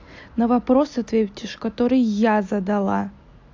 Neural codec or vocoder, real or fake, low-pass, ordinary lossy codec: none; real; 7.2 kHz; AAC, 48 kbps